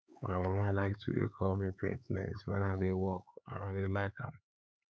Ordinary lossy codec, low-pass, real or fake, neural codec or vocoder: none; none; fake; codec, 16 kHz, 4 kbps, X-Codec, HuBERT features, trained on LibriSpeech